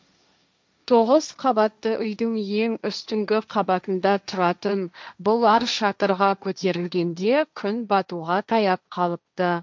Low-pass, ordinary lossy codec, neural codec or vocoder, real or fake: none; none; codec, 16 kHz, 1.1 kbps, Voila-Tokenizer; fake